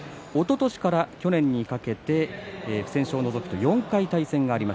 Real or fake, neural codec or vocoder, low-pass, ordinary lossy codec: real; none; none; none